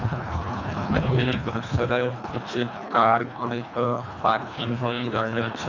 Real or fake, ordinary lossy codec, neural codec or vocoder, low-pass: fake; none; codec, 24 kHz, 1.5 kbps, HILCodec; 7.2 kHz